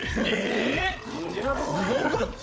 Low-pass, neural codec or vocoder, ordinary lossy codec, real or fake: none; codec, 16 kHz, 16 kbps, FreqCodec, larger model; none; fake